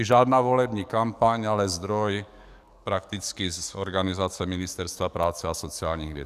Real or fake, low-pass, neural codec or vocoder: fake; 14.4 kHz; codec, 44.1 kHz, 7.8 kbps, DAC